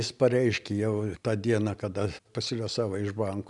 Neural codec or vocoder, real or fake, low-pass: none; real; 10.8 kHz